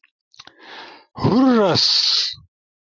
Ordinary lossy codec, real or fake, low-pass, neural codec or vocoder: MP3, 64 kbps; real; 7.2 kHz; none